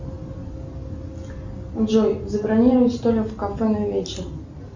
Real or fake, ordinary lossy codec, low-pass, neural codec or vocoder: real; Opus, 64 kbps; 7.2 kHz; none